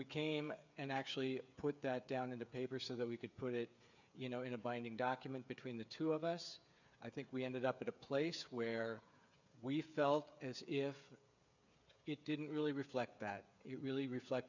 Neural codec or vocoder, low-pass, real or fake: codec, 16 kHz, 8 kbps, FreqCodec, smaller model; 7.2 kHz; fake